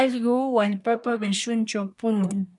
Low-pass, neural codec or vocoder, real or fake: 10.8 kHz; codec, 24 kHz, 1 kbps, SNAC; fake